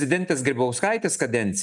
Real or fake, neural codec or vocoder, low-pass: real; none; 10.8 kHz